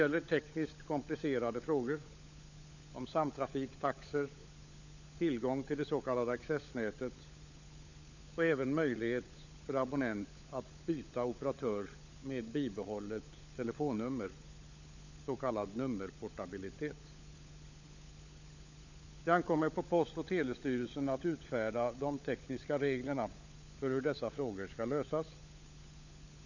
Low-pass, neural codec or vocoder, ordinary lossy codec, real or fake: 7.2 kHz; codec, 16 kHz, 16 kbps, FunCodec, trained on LibriTTS, 50 frames a second; none; fake